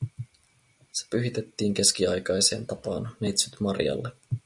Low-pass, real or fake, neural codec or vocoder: 10.8 kHz; real; none